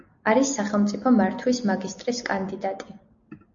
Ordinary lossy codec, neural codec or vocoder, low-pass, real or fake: AAC, 64 kbps; none; 7.2 kHz; real